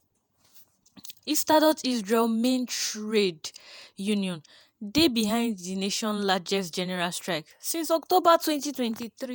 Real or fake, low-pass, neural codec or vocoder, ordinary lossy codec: fake; none; vocoder, 48 kHz, 128 mel bands, Vocos; none